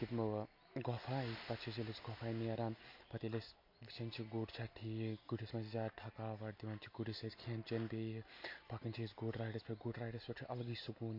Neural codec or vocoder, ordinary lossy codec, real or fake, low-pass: none; MP3, 32 kbps; real; 5.4 kHz